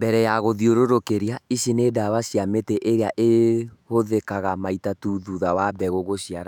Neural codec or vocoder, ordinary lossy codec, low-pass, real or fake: autoencoder, 48 kHz, 128 numbers a frame, DAC-VAE, trained on Japanese speech; none; 19.8 kHz; fake